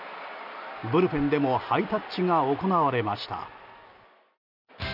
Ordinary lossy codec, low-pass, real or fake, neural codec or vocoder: none; 5.4 kHz; real; none